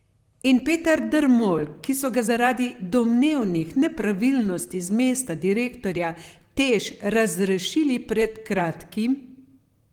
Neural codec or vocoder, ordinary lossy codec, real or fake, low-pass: vocoder, 44.1 kHz, 128 mel bands, Pupu-Vocoder; Opus, 24 kbps; fake; 19.8 kHz